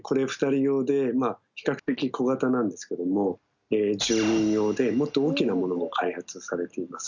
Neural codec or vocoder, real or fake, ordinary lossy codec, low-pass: none; real; none; 7.2 kHz